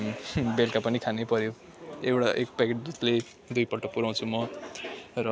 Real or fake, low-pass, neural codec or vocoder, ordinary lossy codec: real; none; none; none